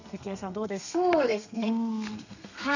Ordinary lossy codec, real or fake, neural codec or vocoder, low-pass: none; fake; codec, 32 kHz, 1.9 kbps, SNAC; 7.2 kHz